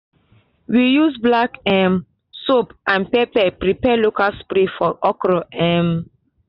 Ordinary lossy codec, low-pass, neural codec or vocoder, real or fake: none; 5.4 kHz; none; real